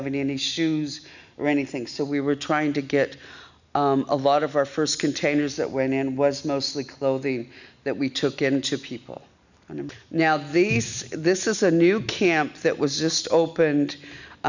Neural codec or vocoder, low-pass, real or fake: none; 7.2 kHz; real